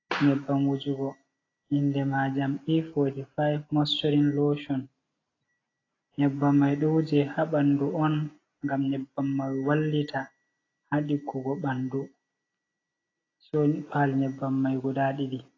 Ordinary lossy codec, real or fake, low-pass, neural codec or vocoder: AAC, 32 kbps; real; 7.2 kHz; none